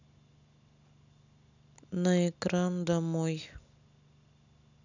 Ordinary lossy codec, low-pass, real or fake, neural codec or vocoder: none; 7.2 kHz; real; none